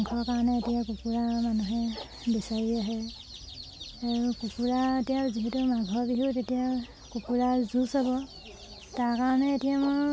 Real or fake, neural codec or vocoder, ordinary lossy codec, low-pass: real; none; none; none